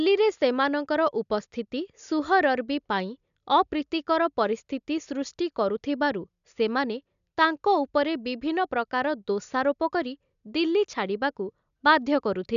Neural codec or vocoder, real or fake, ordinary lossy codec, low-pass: none; real; none; 7.2 kHz